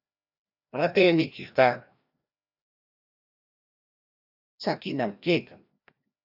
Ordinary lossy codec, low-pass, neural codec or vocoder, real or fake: AAC, 48 kbps; 5.4 kHz; codec, 16 kHz, 1 kbps, FreqCodec, larger model; fake